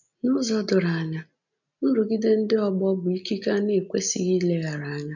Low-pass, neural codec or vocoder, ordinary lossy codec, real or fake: 7.2 kHz; none; none; real